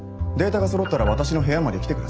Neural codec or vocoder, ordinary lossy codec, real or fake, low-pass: none; none; real; none